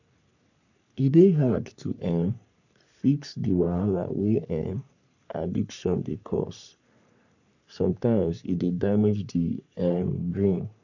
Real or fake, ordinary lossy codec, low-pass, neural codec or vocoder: fake; none; 7.2 kHz; codec, 44.1 kHz, 3.4 kbps, Pupu-Codec